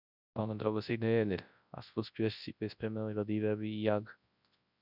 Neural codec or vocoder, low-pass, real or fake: codec, 24 kHz, 0.9 kbps, WavTokenizer, large speech release; 5.4 kHz; fake